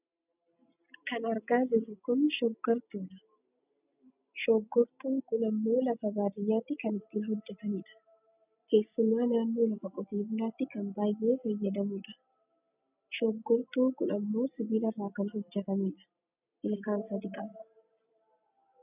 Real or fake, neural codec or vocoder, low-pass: real; none; 3.6 kHz